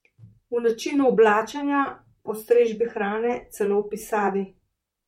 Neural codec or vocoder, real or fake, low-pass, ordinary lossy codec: vocoder, 44.1 kHz, 128 mel bands, Pupu-Vocoder; fake; 19.8 kHz; MP3, 64 kbps